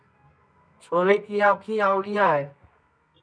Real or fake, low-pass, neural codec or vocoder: fake; 9.9 kHz; codec, 24 kHz, 0.9 kbps, WavTokenizer, medium music audio release